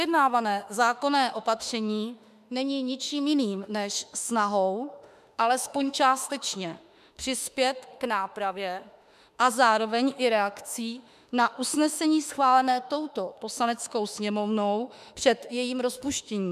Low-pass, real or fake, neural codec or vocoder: 14.4 kHz; fake; autoencoder, 48 kHz, 32 numbers a frame, DAC-VAE, trained on Japanese speech